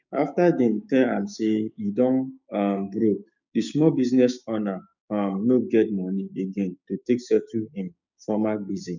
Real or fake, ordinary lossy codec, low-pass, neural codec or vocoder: fake; none; 7.2 kHz; codec, 16 kHz, 6 kbps, DAC